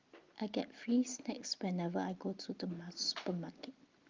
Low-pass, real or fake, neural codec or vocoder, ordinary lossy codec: 7.2 kHz; real; none; Opus, 32 kbps